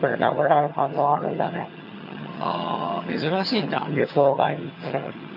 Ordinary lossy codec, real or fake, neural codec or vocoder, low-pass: none; fake; vocoder, 22.05 kHz, 80 mel bands, HiFi-GAN; 5.4 kHz